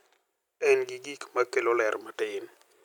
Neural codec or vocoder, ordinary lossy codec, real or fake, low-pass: none; none; real; 19.8 kHz